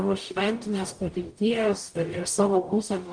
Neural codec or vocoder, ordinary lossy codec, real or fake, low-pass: codec, 44.1 kHz, 0.9 kbps, DAC; Opus, 32 kbps; fake; 9.9 kHz